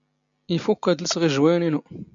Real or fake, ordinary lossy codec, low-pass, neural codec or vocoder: real; MP3, 64 kbps; 7.2 kHz; none